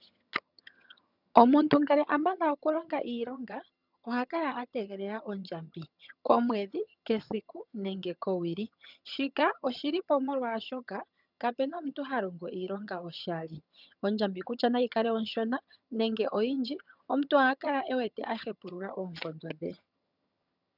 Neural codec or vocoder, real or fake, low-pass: vocoder, 22.05 kHz, 80 mel bands, HiFi-GAN; fake; 5.4 kHz